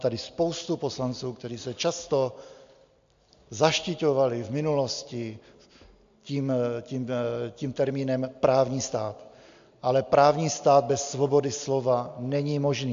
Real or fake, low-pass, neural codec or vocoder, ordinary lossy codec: real; 7.2 kHz; none; AAC, 64 kbps